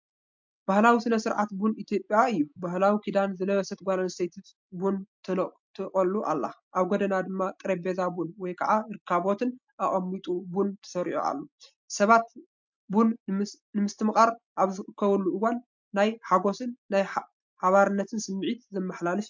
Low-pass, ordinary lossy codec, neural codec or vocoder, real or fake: 7.2 kHz; MP3, 64 kbps; none; real